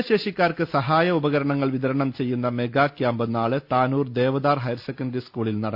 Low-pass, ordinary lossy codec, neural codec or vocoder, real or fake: 5.4 kHz; Opus, 64 kbps; none; real